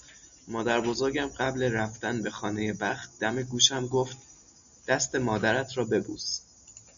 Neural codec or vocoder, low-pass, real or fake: none; 7.2 kHz; real